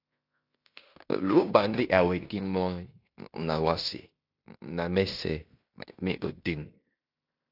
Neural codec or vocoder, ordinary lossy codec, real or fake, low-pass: codec, 16 kHz in and 24 kHz out, 0.9 kbps, LongCat-Audio-Codec, fine tuned four codebook decoder; AAC, 48 kbps; fake; 5.4 kHz